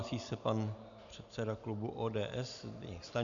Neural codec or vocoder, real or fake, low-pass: none; real; 7.2 kHz